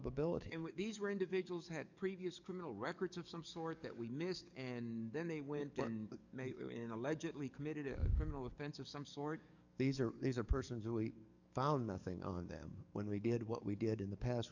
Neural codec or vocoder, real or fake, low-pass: codec, 44.1 kHz, 7.8 kbps, DAC; fake; 7.2 kHz